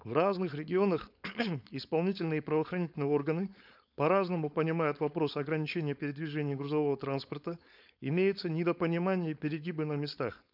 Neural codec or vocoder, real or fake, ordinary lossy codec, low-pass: codec, 16 kHz, 4.8 kbps, FACodec; fake; none; 5.4 kHz